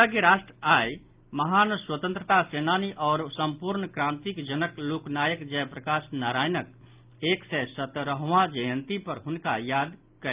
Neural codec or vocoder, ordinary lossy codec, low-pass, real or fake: none; Opus, 24 kbps; 3.6 kHz; real